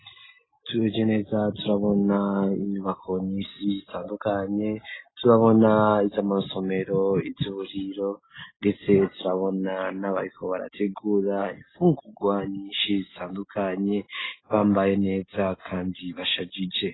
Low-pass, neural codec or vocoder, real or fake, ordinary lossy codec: 7.2 kHz; none; real; AAC, 16 kbps